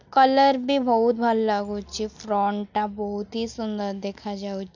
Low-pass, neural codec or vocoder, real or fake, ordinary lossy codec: 7.2 kHz; none; real; none